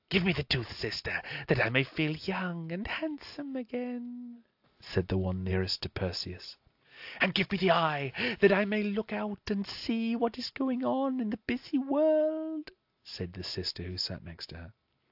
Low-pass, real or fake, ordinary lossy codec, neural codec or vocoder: 5.4 kHz; real; AAC, 48 kbps; none